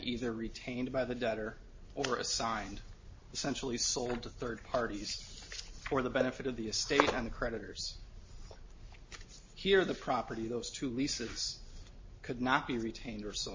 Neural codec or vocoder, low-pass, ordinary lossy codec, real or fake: none; 7.2 kHz; MP3, 32 kbps; real